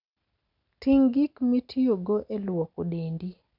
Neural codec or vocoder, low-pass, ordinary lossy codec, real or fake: none; 5.4 kHz; none; real